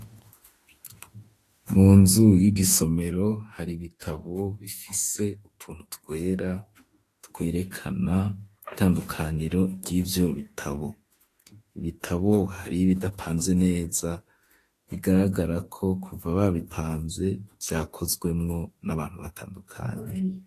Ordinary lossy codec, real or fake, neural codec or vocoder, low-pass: AAC, 48 kbps; fake; autoencoder, 48 kHz, 32 numbers a frame, DAC-VAE, trained on Japanese speech; 14.4 kHz